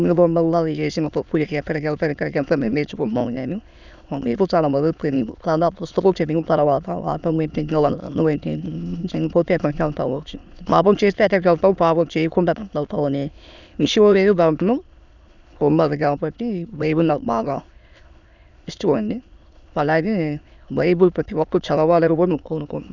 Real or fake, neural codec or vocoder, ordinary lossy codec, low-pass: fake; autoencoder, 22.05 kHz, a latent of 192 numbers a frame, VITS, trained on many speakers; none; 7.2 kHz